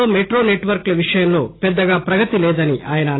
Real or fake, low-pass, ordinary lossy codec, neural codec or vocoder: real; 7.2 kHz; AAC, 16 kbps; none